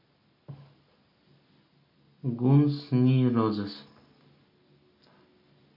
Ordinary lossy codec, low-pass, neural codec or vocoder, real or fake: MP3, 32 kbps; 5.4 kHz; none; real